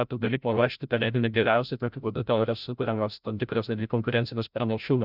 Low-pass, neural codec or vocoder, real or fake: 5.4 kHz; codec, 16 kHz, 0.5 kbps, FreqCodec, larger model; fake